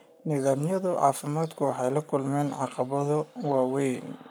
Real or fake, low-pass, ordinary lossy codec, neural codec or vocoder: fake; none; none; codec, 44.1 kHz, 7.8 kbps, Pupu-Codec